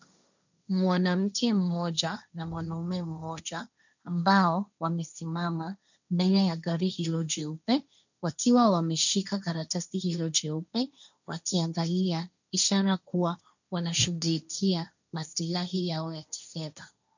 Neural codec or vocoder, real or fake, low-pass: codec, 16 kHz, 1.1 kbps, Voila-Tokenizer; fake; 7.2 kHz